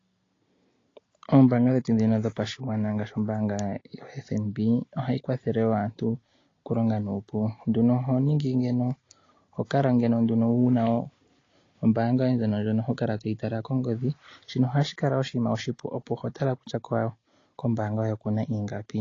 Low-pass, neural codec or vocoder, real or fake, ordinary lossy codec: 7.2 kHz; none; real; AAC, 32 kbps